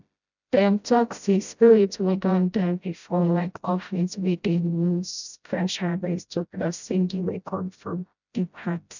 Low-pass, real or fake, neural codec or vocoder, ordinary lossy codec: 7.2 kHz; fake; codec, 16 kHz, 0.5 kbps, FreqCodec, smaller model; none